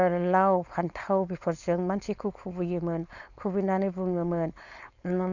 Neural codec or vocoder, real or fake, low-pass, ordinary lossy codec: codec, 16 kHz, 4.8 kbps, FACodec; fake; 7.2 kHz; none